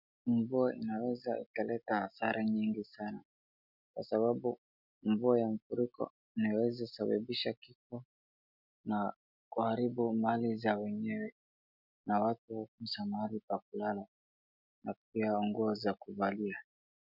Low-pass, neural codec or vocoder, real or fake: 5.4 kHz; none; real